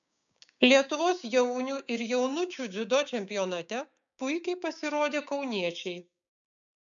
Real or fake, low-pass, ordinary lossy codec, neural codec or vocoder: fake; 7.2 kHz; MP3, 96 kbps; codec, 16 kHz, 6 kbps, DAC